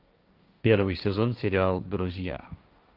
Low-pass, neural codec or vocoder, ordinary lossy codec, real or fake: 5.4 kHz; codec, 16 kHz, 1.1 kbps, Voila-Tokenizer; Opus, 24 kbps; fake